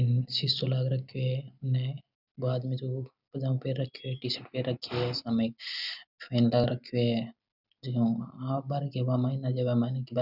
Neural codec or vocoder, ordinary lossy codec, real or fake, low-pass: none; none; real; 5.4 kHz